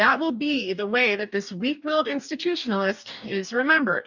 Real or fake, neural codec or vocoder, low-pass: fake; codec, 44.1 kHz, 2.6 kbps, DAC; 7.2 kHz